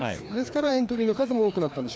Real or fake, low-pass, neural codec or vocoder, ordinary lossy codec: fake; none; codec, 16 kHz, 2 kbps, FreqCodec, larger model; none